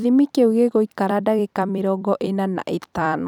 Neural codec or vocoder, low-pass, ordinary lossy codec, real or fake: vocoder, 44.1 kHz, 128 mel bands every 256 samples, BigVGAN v2; 19.8 kHz; none; fake